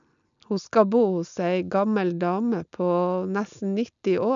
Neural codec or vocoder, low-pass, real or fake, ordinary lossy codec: none; 7.2 kHz; real; none